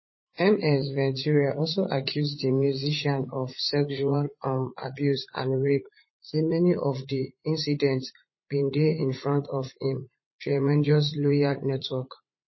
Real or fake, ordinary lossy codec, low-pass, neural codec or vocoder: fake; MP3, 24 kbps; 7.2 kHz; vocoder, 44.1 kHz, 80 mel bands, Vocos